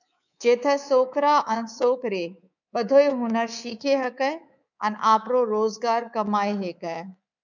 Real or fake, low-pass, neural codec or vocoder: fake; 7.2 kHz; codec, 24 kHz, 3.1 kbps, DualCodec